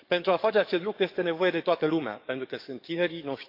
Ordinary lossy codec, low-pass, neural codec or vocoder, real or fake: AAC, 48 kbps; 5.4 kHz; codec, 44.1 kHz, 7.8 kbps, DAC; fake